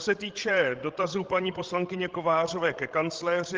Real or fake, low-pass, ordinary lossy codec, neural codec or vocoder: fake; 7.2 kHz; Opus, 16 kbps; codec, 16 kHz, 16 kbps, FreqCodec, larger model